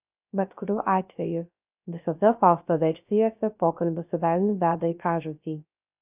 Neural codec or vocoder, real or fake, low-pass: codec, 16 kHz, 0.3 kbps, FocalCodec; fake; 3.6 kHz